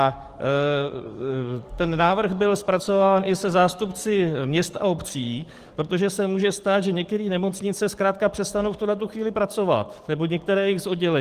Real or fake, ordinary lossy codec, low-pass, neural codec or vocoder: fake; Opus, 24 kbps; 14.4 kHz; codec, 44.1 kHz, 7.8 kbps, DAC